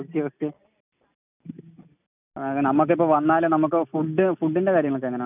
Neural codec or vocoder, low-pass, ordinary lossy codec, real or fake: none; 3.6 kHz; none; real